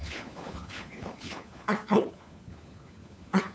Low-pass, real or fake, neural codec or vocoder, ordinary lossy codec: none; fake; codec, 16 kHz, 4 kbps, FunCodec, trained on LibriTTS, 50 frames a second; none